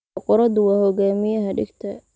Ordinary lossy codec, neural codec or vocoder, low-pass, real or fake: none; none; none; real